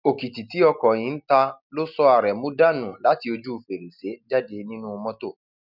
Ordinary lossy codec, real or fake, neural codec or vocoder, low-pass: none; real; none; 5.4 kHz